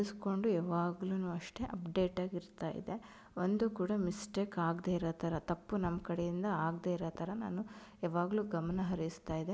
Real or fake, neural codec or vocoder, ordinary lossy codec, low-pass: real; none; none; none